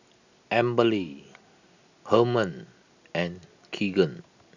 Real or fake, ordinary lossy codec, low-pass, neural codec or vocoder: real; none; 7.2 kHz; none